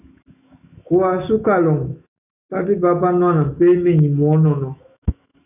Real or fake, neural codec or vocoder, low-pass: fake; autoencoder, 48 kHz, 128 numbers a frame, DAC-VAE, trained on Japanese speech; 3.6 kHz